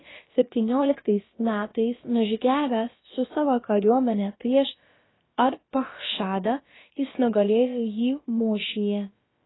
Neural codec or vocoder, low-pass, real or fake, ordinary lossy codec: codec, 16 kHz, about 1 kbps, DyCAST, with the encoder's durations; 7.2 kHz; fake; AAC, 16 kbps